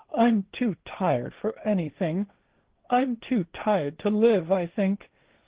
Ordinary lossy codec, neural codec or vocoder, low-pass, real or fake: Opus, 16 kbps; codec, 16 kHz, 1.1 kbps, Voila-Tokenizer; 3.6 kHz; fake